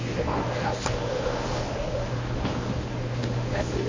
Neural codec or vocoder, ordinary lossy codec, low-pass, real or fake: codec, 24 kHz, 0.9 kbps, WavTokenizer, medium speech release version 1; MP3, 32 kbps; 7.2 kHz; fake